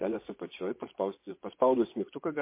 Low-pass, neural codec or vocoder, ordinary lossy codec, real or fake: 3.6 kHz; vocoder, 24 kHz, 100 mel bands, Vocos; MP3, 24 kbps; fake